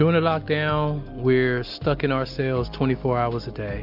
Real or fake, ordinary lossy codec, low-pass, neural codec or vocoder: real; MP3, 48 kbps; 5.4 kHz; none